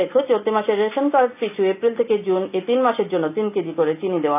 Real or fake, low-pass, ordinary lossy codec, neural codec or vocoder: real; 3.6 kHz; none; none